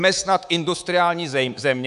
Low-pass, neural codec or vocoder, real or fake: 10.8 kHz; codec, 24 kHz, 3.1 kbps, DualCodec; fake